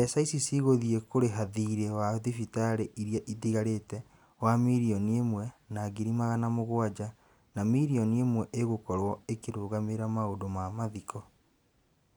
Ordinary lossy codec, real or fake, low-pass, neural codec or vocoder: none; real; none; none